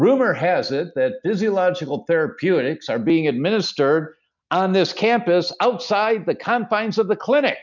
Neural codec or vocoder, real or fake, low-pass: none; real; 7.2 kHz